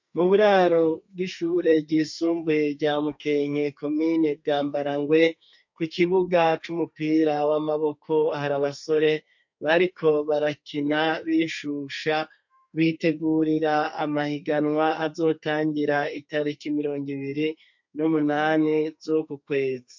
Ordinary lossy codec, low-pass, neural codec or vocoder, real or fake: MP3, 48 kbps; 7.2 kHz; codec, 32 kHz, 1.9 kbps, SNAC; fake